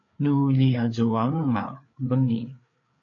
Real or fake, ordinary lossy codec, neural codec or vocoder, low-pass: fake; AAC, 48 kbps; codec, 16 kHz, 4 kbps, FreqCodec, larger model; 7.2 kHz